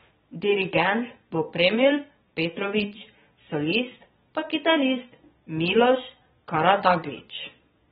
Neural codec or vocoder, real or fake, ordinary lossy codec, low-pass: vocoder, 22.05 kHz, 80 mel bands, Vocos; fake; AAC, 16 kbps; 9.9 kHz